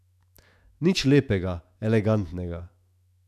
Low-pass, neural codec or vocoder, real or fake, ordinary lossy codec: 14.4 kHz; autoencoder, 48 kHz, 128 numbers a frame, DAC-VAE, trained on Japanese speech; fake; none